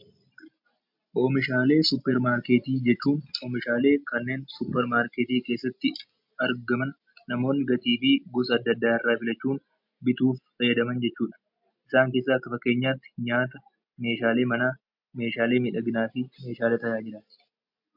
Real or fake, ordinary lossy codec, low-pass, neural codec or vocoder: real; MP3, 48 kbps; 5.4 kHz; none